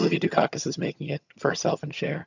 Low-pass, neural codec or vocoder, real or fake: 7.2 kHz; vocoder, 22.05 kHz, 80 mel bands, HiFi-GAN; fake